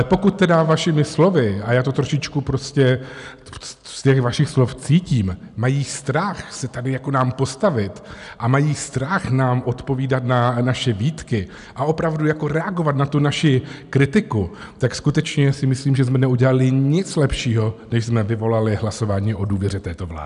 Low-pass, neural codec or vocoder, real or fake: 10.8 kHz; none; real